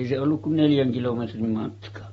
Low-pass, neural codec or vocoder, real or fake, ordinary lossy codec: 19.8 kHz; none; real; AAC, 24 kbps